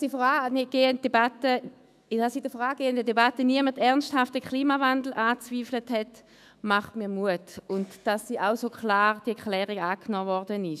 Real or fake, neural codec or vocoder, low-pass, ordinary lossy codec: fake; autoencoder, 48 kHz, 128 numbers a frame, DAC-VAE, trained on Japanese speech; 14.4 kHz; none